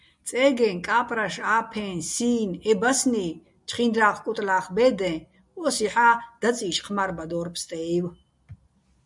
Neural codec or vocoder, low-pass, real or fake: none; 10.8 kHz; real